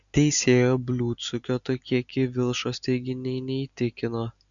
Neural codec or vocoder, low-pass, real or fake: none; 7.2 kHz; real